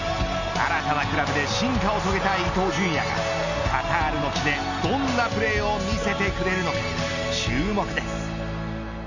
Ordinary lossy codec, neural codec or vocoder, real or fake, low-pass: none; none; real; 7.2 kHz